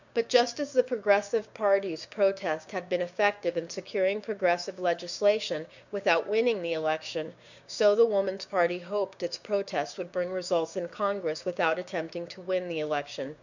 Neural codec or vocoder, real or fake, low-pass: codec, 16 kHz, 6 kbps, DAC; fake; 7.2 kHz